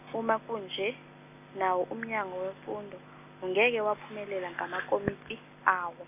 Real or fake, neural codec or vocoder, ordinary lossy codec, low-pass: real; none; none; 3.6 kHz